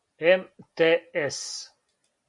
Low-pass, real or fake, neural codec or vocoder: 10.8 kHz; real; none